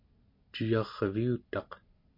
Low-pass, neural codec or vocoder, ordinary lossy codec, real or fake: 5.4 kHz; none; MP3, 32 kbps; real